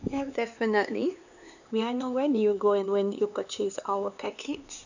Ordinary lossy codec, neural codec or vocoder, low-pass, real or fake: none; codec, 16 kHz, 4 kbps, X-Codec, HuBERT features, trained on LibriSpeech; 7.2 kHz; fake